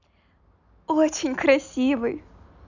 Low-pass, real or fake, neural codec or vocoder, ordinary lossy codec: 7.2 kHz; real; none; none